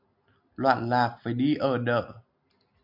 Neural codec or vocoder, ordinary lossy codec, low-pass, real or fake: none; MP3, 48 kbps; 5.4 kHz; real